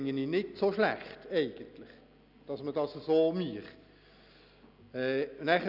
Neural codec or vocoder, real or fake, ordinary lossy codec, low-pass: none; real; none; 5.4 kHz